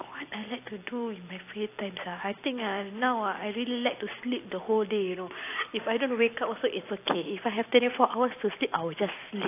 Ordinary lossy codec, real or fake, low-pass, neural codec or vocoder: AAC, 24 kbps; fake; 3.6 kHz; vocoder, 44.1 kHz, 128 mel bands every 256 samples, BigVGAN v2